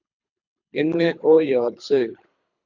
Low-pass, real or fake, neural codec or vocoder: 7.2 kHz; fake; codec, 24 kHz, 3 kbps, HILCodec